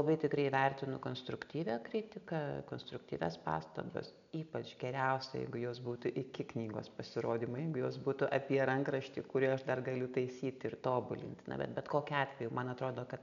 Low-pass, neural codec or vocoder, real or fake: 7.2 kHz; none; real